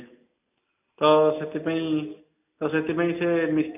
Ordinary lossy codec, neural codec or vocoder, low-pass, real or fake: AAC, 32 kbps; none; 3.6 kHz; real